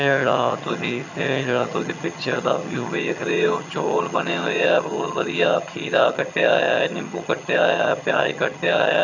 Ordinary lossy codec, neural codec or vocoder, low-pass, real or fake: none; vocoder, 22.05 kHz, 80 mel bands, HiFi-GAN; 7.2 kHz; fake